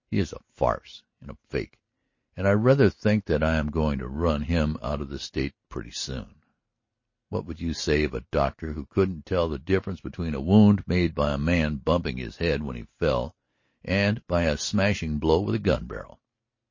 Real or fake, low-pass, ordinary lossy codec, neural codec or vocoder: real; 7.2 kHz; MP3, 32 kbps; none